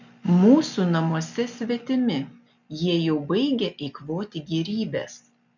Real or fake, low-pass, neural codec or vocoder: real; 7.2 kHz; none